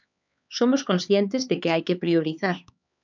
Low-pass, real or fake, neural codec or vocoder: 7.2 kHz; fake; codec, 16 kHz, 4 kbps, X-Codec, HuBERT features, trained on LibriSpeech